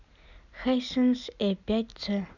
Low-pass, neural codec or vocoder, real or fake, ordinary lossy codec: 7.2 kHz; none; real; none